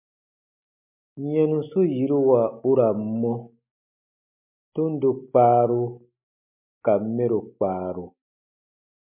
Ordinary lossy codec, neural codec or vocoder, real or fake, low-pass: MP3, 32 kbps; none; real; 3.6 kHz